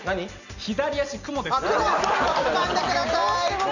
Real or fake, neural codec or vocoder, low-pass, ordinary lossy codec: real; none; 7.2 kHz; none